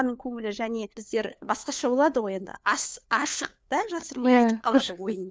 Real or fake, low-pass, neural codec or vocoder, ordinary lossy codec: fake; none; codec, 16 kHz, 4 kbps, FunCodec, trained on LibriTTS, 50 frames a second; none